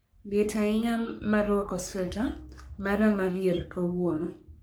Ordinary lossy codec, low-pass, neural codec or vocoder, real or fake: none; none; codec, 44.1 kHz, 3.4 kbps, Pupu-Codec; fake